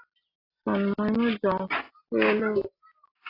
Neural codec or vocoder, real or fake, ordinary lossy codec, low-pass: none; real; AAC, 24 kbps; 5.4 kHz